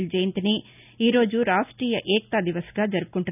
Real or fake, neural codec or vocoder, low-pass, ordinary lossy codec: real; none; 3.6 kHz; none